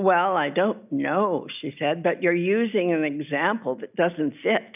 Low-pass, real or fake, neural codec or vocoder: 3.6 kHz; real; none